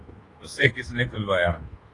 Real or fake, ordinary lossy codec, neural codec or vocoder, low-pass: fake; AAC, 32 kbps; codec, 24 kHz, 1.2 kbps, DualCodec; 10.8 kHz